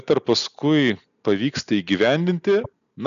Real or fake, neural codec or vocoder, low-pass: real; none; 7.2 kHz